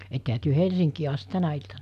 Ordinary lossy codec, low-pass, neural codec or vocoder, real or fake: AAC, 96 kbps; 14.4 kHz; none; real